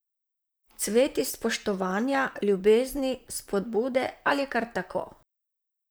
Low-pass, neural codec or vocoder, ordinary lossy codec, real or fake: none; vocoder, 44.1 kHz, 128 mel bands, Pupu-Vocoder; none; fake